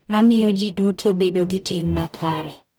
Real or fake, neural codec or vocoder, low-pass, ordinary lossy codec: fake; codec, 44.1 kHz, 0.9 kbps, DAC; none; none